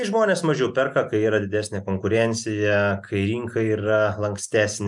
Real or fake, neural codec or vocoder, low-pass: real; none; 10.8 kHz